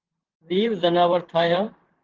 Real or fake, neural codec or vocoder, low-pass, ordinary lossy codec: fake; vocoder, 44.1 kHz, 128 mel bands, Pupu-Vocoder; 7.2 kHz; Opus, 16 kbps